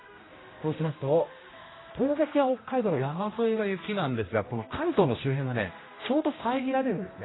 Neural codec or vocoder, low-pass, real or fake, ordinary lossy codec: codec, 16 kHz, 1 kbps, X-Codec, HuBERT features, trained on general audio; 7.2 kHz; fake; AAC, 16 kbps